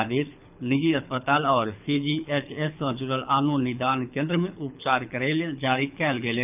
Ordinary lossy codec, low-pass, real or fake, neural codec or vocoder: none; 3.6 kHz; fake; codec, 24 kHz, 6 kbps, HILCodec